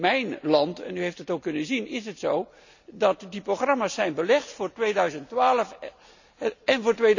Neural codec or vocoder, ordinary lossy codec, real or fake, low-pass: none; none; real; 7.2 kHz